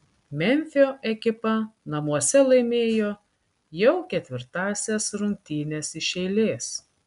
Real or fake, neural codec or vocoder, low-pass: real; none; 10.8 kHz